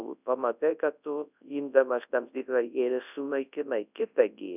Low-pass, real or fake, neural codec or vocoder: 3.6 kHz; fake; codec, 24 kHz, 0.9 kbps, WavTokenizer, large speech release